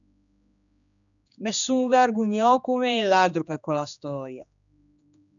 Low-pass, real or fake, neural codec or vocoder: 7.2 kHz; fake; codec, 16 kHz, 2 kbps, X-Codec, HuBERT features, trained on general audio